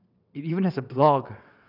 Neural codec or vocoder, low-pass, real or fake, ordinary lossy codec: vocoder, 22.05 kHz, 80 mel bands, WaveNeXt; 5.4 kHz; fake; none